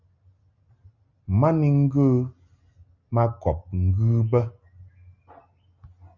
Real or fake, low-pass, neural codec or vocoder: real; 7.2 kHz; none